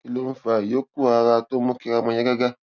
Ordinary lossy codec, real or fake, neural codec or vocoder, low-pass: none; real; none; 7.2 kHz